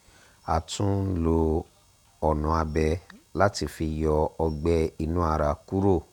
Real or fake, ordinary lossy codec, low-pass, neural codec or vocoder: fake; none; 19.8 kHz; vocoder, 48 kHz, 128 mel bands, Vocos